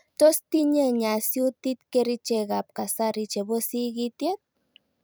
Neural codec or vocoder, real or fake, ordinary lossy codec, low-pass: vocoder, 44.1 kHz, 128 mel bands every 256 samples, BigVGAN v2; fake; none; none